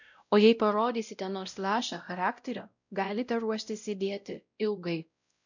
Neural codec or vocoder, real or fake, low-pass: codec, 16 kHz, 0.5 kbps, X-Codec, WavLM features, trained on Multilingual LibriSpeech; fake; 7.2 kHz